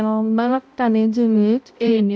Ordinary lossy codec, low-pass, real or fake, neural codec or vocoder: none; none; fake; codec, 16 kHz, 0.5 kbps, X-Codec, HuBERT features, trained on balanced general audio